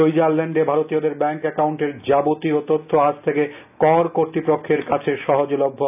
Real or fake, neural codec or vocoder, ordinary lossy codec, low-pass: real; none; none; 3.6 kHz